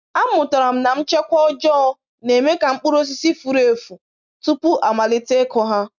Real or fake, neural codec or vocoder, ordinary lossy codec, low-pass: real; none; none; 7.2 kHz